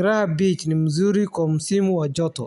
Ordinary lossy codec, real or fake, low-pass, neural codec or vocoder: none; real; 10.8 kHz; none